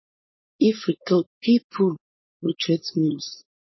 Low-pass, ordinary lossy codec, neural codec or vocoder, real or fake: 7.2 kHz; MP3, 24 kbps; codec, 16 kHz, 4.8 kbps, FACodec; fake